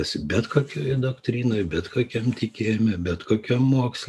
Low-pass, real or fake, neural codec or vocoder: 14.4 kHz; real; none